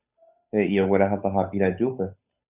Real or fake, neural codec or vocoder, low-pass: fake; codec, 16 kHz, 8 kbps, FunCodec, trained on Chinese and English, 25 frames a second; 3.6 kHz